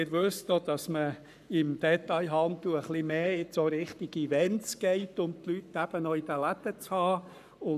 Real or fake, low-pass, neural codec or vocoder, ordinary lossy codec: fake; 14.4 kHz; codec, 44.1 kHz, 7.8 kbps, Pupu-Codec; none